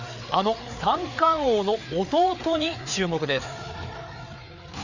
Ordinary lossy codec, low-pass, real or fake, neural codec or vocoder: none; 7.2 kHz; fake; codec, 16 kHz, 4 kbps, FreqCodec, larger model